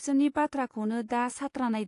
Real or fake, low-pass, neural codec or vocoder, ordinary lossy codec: fake; 10.8 kHz; vocoder, 24 kHz, 100 mel bands, Vocos; AAC, 48 kbps